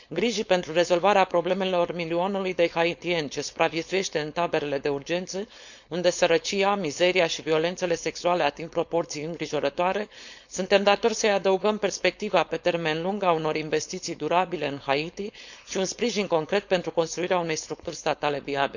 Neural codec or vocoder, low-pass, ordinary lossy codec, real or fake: codec, 16 kHz, 4.8 kbps, FACodec; 7.2 kHz; none; fake